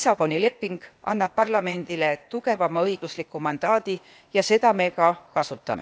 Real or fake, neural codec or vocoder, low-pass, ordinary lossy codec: fake; codec, 16 kHz, 0.8 kbps, ZipCodec; none; none